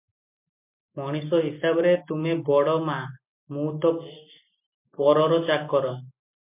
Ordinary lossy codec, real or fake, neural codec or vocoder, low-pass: MP3, 32 kbps; real; none; 3.6 kHz